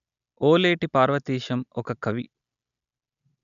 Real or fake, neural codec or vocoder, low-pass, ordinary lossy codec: real; none; 7.2 kHz; none